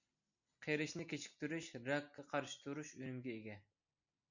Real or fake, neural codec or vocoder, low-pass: real; none; 7.2 kHz